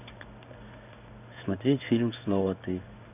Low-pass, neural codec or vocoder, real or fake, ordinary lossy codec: 3.6 kHz; codec, 16 kHz in and 24 kHz out, 1 kbps, XY-Tokenizer; fake; none